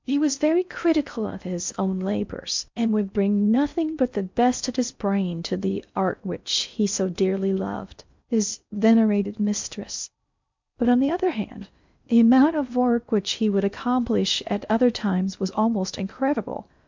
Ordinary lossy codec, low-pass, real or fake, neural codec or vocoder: MP3, 64 kbps; 7.2 kHz; fake; codec, 16 kHz in and 24 kHz out, 0.6 kbps, FocalCodec, streaming, 4096 codes